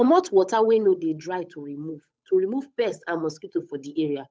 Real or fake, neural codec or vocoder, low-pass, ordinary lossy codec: fake; codec, 16 kHz, 8 kbps, FunCodec, trained on Chinese and English, 25 frames a second; none; none